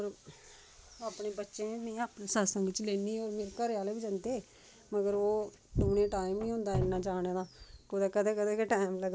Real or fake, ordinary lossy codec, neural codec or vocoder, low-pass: real; none; none; none